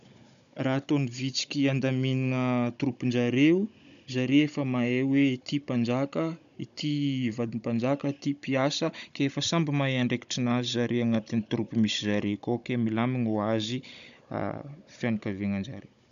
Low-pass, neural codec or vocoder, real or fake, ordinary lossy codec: 7.2 kHz; codec, 16 kHz, 16 kbps, FunCodec, trained on Chinese and English, 50 frames a second; fake; none